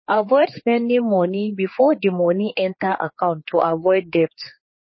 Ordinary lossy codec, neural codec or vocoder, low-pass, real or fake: MP3, 24 kbps; codec, 16 kHz, 4 kbps, X-Codec, HuBERT features, trained on general audio; 7.2 kHz; fake